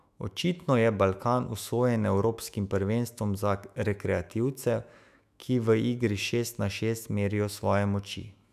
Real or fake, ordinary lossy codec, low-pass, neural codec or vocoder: fake; none; 14.4 kHz; autoencoder, 48 kHz, 128 numbers a frame, DAC-VAE, trained on Japanese speech